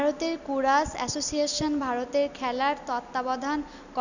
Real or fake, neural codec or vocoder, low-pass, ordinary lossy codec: real; none; 7.2 kHz; none